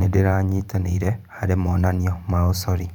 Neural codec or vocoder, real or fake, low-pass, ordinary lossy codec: vocoder, 44.1 kHz, 128 mel bands every 512 samples, BigVGAN v2; fake; 19.8 kHz; none